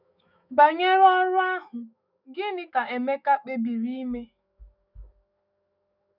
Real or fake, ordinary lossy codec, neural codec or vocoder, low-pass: fake; none; autoencoder, 48 kHz, 128 numbers a frame, DAC-VAE, trained on Japanese speech; 5.4 kHz